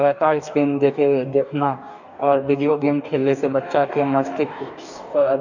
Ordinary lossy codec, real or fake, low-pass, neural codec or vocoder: none; fake; 7.2 kHz; codec, 44.1 kHz, 2.6 kbps, DAC